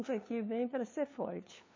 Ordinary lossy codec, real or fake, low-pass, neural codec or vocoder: MP3, 32 kbps; fake; 7.2 kHz; codec, 16 kHz, 2 kbps, FunCodec, trained on LibriTTS, 25 frames a second